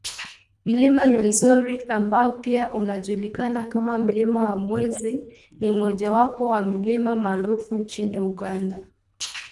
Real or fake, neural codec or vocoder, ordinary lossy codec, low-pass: fake; codec, 24 kHz, 1.5 kbps, HILCodec; none; none